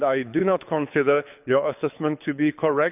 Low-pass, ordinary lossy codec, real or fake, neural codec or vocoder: 3.6 kHz; none; fake; codec, 24 kHz, 6 kbps, HILCodec